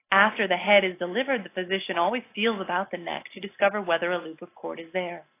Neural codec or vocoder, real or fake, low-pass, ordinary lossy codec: none; real; 3.6 kHz; AAC, 24 kbps